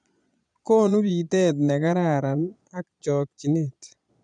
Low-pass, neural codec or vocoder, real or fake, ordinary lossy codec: 9.9 kHz; vocoder, 22.05 kHz, 80 mel bands, Vocos; fake; none